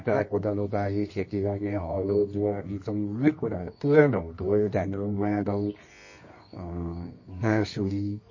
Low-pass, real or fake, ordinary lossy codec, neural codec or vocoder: 7.2 kHz; fake; MP3, 32 kbps; codec, 24 kHz, 0.9 kbps, WavTokenizer, medium music audio release